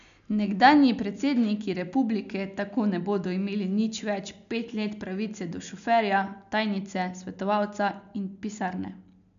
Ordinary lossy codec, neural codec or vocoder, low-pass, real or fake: AAC, 96 kbps; none; 7.2 kHz; real